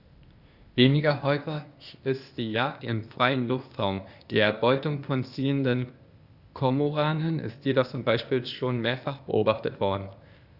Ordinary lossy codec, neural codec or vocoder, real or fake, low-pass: Opus, 64 kbps; codec, 16 kHz, 0.8 kbps, ZipCodec; fake; 5.4 kHz